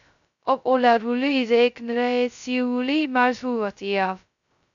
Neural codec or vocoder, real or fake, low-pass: codec, 16 kHz, 0.2 kbps, FocalCodec; fake; 7.2 kHz